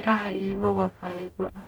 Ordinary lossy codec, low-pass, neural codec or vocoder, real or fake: none; none; codec, 44.1 kHz, 0.9 kbps, DAC; fake